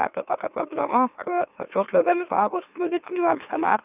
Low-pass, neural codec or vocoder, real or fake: 3.6 kHz; autoencoder, 44.1 kHz, a latent of 192 numbers a frame, MeloTTS; fake